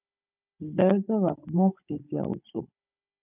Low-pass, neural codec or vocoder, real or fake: 3.6 kHz; codec, 16 kHz, 16 kbps, FunCodec, trained on Chinese and English, 50 frames a second; fake